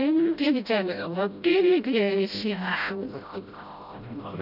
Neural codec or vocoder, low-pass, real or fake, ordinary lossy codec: codec, 16 kHz, 0.5 kbps, FreqCodec, smaller model; 5.4 kHz; fake; none